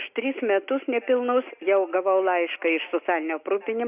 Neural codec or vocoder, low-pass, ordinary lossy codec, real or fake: none; 3.6 kHz; Opus, 64 kbps; real